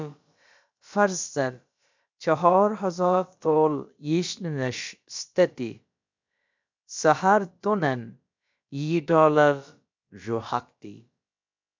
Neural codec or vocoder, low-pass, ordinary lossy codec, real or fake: codec, 16 kHz, about 1 kbps, DyCAST, with the encoder's durations; 7.2 kHz; MP3, 64 kbps; fake